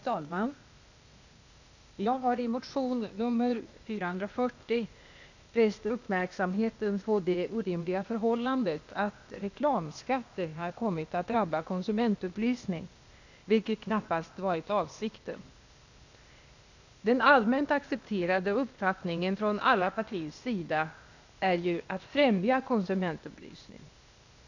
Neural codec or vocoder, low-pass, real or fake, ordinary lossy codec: codec, 16 kHz, 0.8 kbps, ZipCodec; 7.2 kHz; fake; none